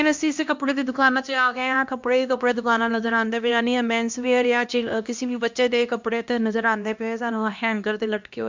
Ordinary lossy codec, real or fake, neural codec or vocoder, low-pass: MP3, 64 kbps; fake; codec, 16 kHz, 1 kbps, X-Codec, HuBERT features, trained on LibriSpeech; 7.2 kHz